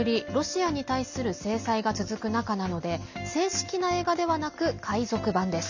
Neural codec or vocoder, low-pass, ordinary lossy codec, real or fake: none; 7.2 kHz; none; real